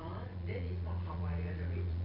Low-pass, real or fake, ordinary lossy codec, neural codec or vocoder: 5.4 kHz; fake; none; vocoder, 22.05 kHz, 80 mel bands, Vocos